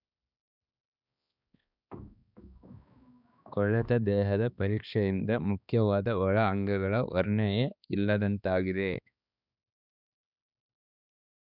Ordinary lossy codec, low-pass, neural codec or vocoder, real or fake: none; 5.4 kHz; codec, 16 kHz, 2 kbps, X-Codec, HuBERT features, trained on balanced general audio; fake